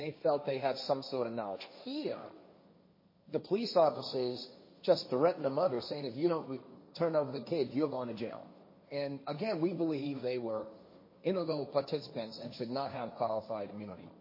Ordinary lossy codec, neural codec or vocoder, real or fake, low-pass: MP3, 24 kbps; codec, 16 kHz, 1.1 kbps, Voila-Tokenizer; fake; 5.4 kHz